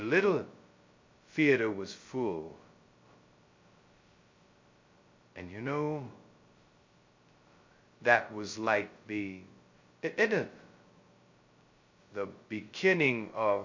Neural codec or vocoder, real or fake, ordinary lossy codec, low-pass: codec, 16 kHz, 0.2 kbps, FocalCodec; fake; MP3, 48 kbps; 7.2 kHz